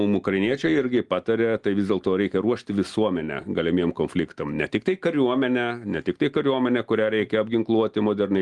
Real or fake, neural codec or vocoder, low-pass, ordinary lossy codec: real; none; 10.8 kHz; Opus, 24 kbps